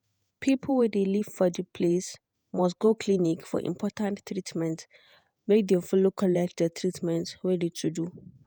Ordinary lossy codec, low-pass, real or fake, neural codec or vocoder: none; none; fake; vocoder, 48 kHz, 128 mel bands, Vocos